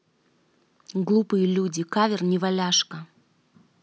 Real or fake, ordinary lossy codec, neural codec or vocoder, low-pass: real; none; none; none